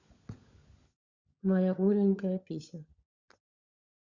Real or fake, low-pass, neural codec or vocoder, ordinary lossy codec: fake; 7.2 kHz; codec, 16 kHz, 16 kbps, FunCodec, trained on LibriTTS, 50 frames a second; Opus, 64 kbps